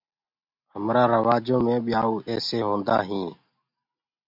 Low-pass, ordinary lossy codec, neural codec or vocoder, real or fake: 5.4 kHz; MP3, 48 kbps; none; real